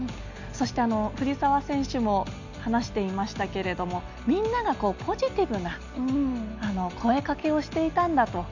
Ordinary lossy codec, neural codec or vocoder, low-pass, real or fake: none; none; 7.2 kHz; real